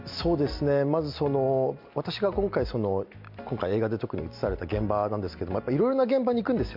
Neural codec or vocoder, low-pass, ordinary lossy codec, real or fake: none; 5.4 kHz; MP3, 48 kbps; real